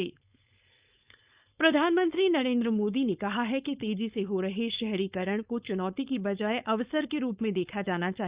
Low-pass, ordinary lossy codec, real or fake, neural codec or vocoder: 3.6 kHz; Opus, 64 kbps; fake; codec, 16 kHz, 4.8 kbps, FACodec